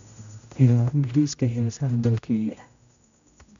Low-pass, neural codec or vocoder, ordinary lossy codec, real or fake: 7.2 kHz; codec, 16 kHz, 0.5 kbps, X-Codec, HuBERT features, trained on general audio; MP3, 64 kbps; fake